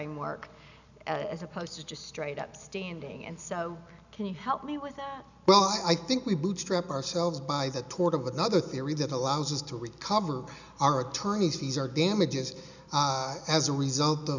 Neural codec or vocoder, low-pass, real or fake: none; 7.2 kHz; real